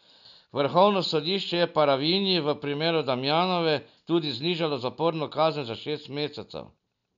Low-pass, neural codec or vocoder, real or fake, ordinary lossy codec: 7.2 kHz; none; real; none